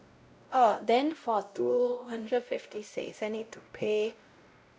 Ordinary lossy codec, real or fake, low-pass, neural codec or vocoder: none; fake; none; codec, 16 kHz, 0.5 kbps, X-Codec, WavLM features, trained on Multilingual LibriSpeech